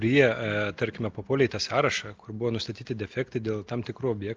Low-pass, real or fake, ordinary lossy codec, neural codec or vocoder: 7.2 kHz; real; Opus, 24 kbps; none